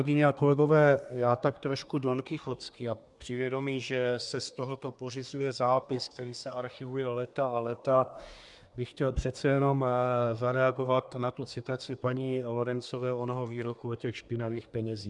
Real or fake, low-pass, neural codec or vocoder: fake; 10.8 kHz; codec, 24 kHz, 1 kbps, SNAC